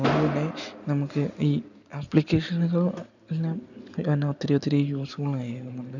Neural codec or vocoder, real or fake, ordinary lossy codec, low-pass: none; real; none; 7.2 kHz